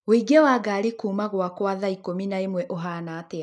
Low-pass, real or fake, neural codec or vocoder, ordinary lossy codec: none; real; none; none